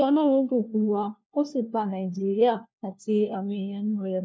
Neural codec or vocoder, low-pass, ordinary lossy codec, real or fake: codec, 16 kHz, 1 kbps, FunCodec, trained on LibriTTS, 50 frames a second; none; none; fake